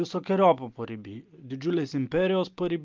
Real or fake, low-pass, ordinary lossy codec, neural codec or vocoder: real; 7.2 kHz; Opus, 24 kbps; none